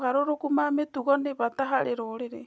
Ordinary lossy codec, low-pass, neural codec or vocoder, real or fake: none; none; none; real